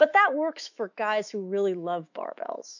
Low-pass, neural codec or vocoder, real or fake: 7.2 kHz; autoencoder, 48 kHz, 128 numbers a frame, DAC-VAE, trained on Japanese speech; fake